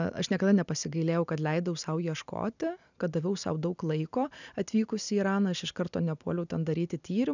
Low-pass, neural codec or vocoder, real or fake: 7.2 kHz; none; real